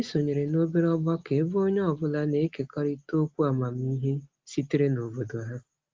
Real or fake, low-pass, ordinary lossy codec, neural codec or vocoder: real; 7.2 kHz; Opus, 32 kbps; none